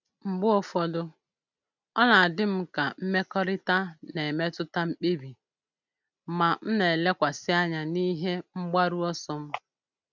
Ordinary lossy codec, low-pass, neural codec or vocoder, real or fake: none; 7.2 kHz; none; real